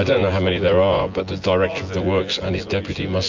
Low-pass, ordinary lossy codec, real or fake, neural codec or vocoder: 7.2 kHz; MP3, 48 kbps; fake; vocoder, 24 kHz, 100 mel bands, Vocos